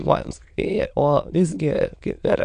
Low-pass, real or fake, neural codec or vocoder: 9.9 kHz; fake; autoencoder, 22.05 kHz, a latent of 192 numbers a frame, VITS, trained on many speakers